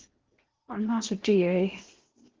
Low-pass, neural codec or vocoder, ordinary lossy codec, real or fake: 7.2 kHz; codec, 16 kHz in and 24 kHz out, 0.8 kbps, FocalCodec, streaming, 65536 codes; Opus, 16 kbps; fake